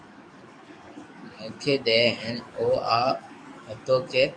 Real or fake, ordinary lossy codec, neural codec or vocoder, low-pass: fake; MP3, 96 kbps; codec, 44.1 kHz, 7.8 kbps, DAC; 9.9 kHz